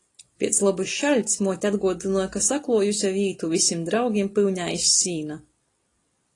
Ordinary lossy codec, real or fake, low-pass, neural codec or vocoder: AAC, 32 kbps; real; 10.8 kHz; none